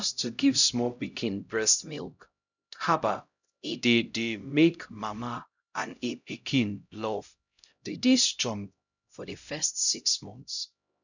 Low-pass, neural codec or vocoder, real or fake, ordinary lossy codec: 7.2 kHz; codec, 16 kHz, 0.5 kbps, X-Codec, HuBERT features, trained on LibriSpeech; fake; none